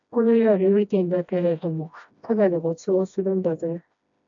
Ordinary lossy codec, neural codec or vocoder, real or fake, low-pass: AAC, 64 kbps; codec, 16 kHz, 1 kbps, FreqCodec, smaller model; fake; 7.2 kHz